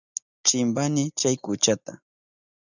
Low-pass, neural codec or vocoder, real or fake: 7.2 kHz; none; real